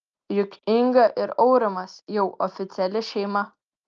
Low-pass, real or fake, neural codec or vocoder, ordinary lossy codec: 7.2 kHz; real; none; Opus, 24 kbps